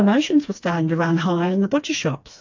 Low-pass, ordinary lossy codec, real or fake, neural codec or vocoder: 7.2 kHz; AAC, 48 kbps; fake; codec, 16 kHz, 2 kbps, FreqCodec, smaller model